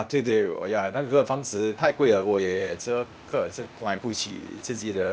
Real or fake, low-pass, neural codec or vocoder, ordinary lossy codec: fake; none; codec, 16 kHz, 0.8 kbps, ZipCodec; none